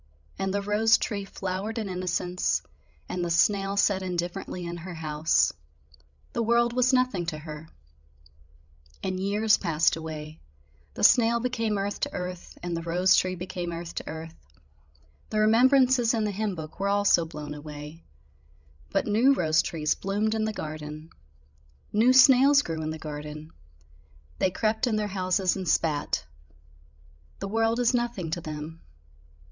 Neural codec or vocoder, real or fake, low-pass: codec, 16 kHz, 16 kbps, FreqCodec, larger model; fake; 7.2 kHz